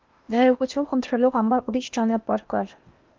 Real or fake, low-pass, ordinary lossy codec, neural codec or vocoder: fake; 7.2 kHz; Opus, 24 kbps; codec, 16 kHz in and 24 kHz out, 0.8 kbps, FocalCodec, streaming, 65536 codes